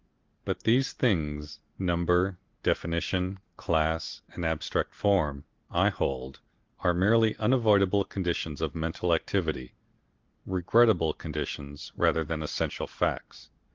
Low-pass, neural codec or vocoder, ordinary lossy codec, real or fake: 7.2 kHz; none; Opus, 16 kbps; real